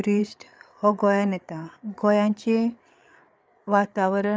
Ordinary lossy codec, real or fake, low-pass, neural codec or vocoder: none; fake; none; codec, 16 kHz, 8 kbps, FreqCodec, larger model